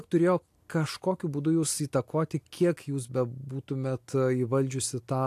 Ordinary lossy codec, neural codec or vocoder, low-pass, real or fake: AAC, 64 kbps; none; 14.4 kHz; real